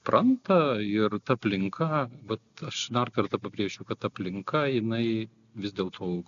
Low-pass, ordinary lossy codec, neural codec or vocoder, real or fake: 7.2 kHz; AAC, 64 kbps; none; real